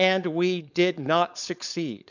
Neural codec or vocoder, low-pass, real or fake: codec, 16 kHz, 4.8 kbps, FACodec; 7.2 kHz; fake